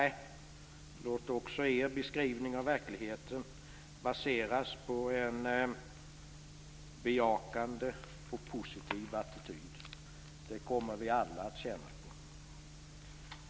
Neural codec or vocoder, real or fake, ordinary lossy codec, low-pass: none; real; none; none